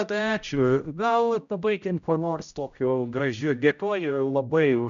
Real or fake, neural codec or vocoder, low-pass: fake; codec, 16 kHz, 0.5 kbps, X-Codec, HuBERT features, trained on general audio; 7.2 kHz